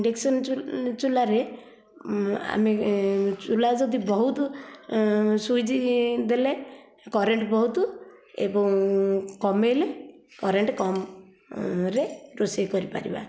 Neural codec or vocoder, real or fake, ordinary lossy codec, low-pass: none; real; none; none